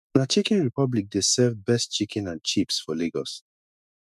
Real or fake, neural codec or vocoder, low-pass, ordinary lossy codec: fake; autoencoder, 48 kHz, 128 numbers a frame, DAC-VAE, trained on Japanese speech; 14.4 kHz; none